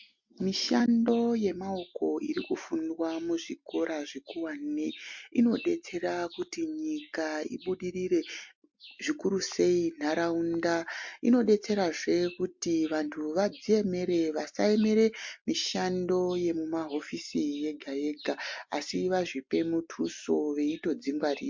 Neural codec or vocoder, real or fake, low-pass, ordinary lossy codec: none; real; 7.2 kHz; MP3, 48 kbps